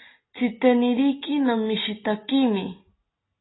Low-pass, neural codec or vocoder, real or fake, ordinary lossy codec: 7.2 kHz; none; real; AAC, 16 kbps